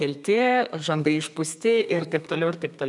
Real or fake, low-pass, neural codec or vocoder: fake; 10.8 kHz; codec, 32 kHz, 1.9 kbps, SNAC